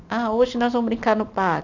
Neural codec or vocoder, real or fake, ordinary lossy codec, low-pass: none; real; none; 7.2 kHz